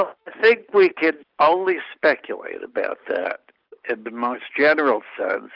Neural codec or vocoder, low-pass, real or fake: none; 5.4 kHz; real